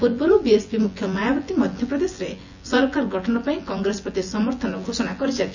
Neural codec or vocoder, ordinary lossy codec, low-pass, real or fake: vocoder, 24 kHz, 100 mel bands, Vocos; none; 7.2 kHz; fake